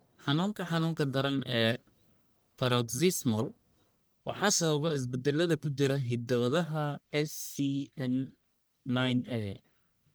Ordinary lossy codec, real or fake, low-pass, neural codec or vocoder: none; fake; none; codec, 44.1 kHz, 1.7 kbps, Pupu-Codec